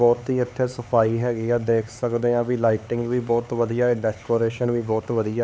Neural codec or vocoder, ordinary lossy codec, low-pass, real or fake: codec, 16 kHz, 4 kbps, X-Codec, HuBERT features, trained on LibriSpeech; none; none; fake